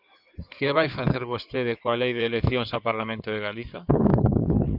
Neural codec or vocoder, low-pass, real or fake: codec, 16 kHz in and 24 kHz out, 2.2 kbps, FireRedTTS-2 codec; 5.4 kHz; fake